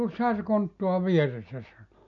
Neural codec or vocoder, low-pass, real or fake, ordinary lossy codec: none; 7.2 kHz; real; none